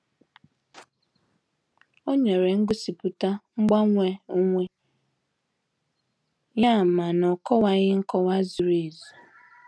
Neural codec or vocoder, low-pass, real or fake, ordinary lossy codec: none; none; real; none